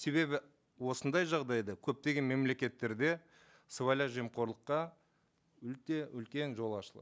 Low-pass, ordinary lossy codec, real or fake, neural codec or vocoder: none; none; real; none